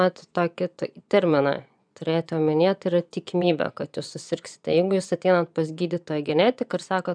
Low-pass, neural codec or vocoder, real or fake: 9.9 kHz; vocoder, 24 kHz, 100 mel bands, Vocos; fake